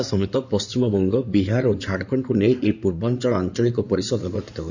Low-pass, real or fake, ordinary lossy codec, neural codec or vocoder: 7.2 kHz; fake; none; codec, 16 kHz in and 24 kHz out, 2.2 kbps, FireRedTTS-2 codec